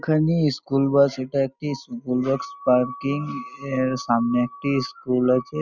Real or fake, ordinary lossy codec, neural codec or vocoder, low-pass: real; none; none; 7.2 kHz